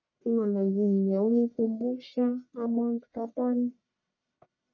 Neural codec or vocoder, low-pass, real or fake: codec, 44.1 kHz, 1.7 kbps, Pupu-Codec; 7.2 kHz; fake